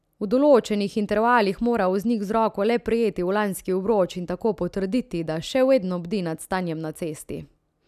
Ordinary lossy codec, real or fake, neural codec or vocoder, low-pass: none; real; none; 14.4 kHz